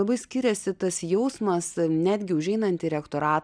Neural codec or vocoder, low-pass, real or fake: none; 9.9 kHz; real